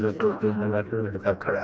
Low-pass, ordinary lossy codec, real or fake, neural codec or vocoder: none; none; fake; codec, 16 kHz, 1 kbps, FreqCodec, smaller model